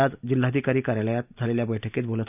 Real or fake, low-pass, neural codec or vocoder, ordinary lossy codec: real; 3.6 kHz; none; none